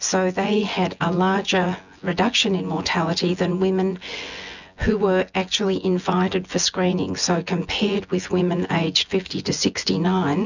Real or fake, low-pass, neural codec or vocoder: fake; 7.2 kHz; vocoder, 24 kHz, 100 mel bands, Vocos